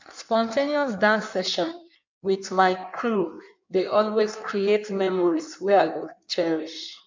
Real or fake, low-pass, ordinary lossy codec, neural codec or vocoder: fake; 7.2 kHz; MP3, 64 kbps; codec, 16 kHz in and 24 kHz out, 1.1 kbps, FireRedTTS-2 codec